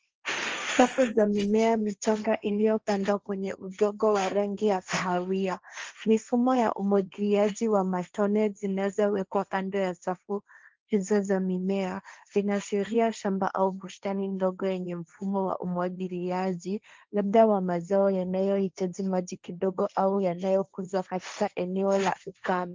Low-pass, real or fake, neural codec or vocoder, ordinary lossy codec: 7.2 kHz; fake; codec, 16 kHz, 1.1 kbps, Voila-Tokenizer; Opus, 24 kbps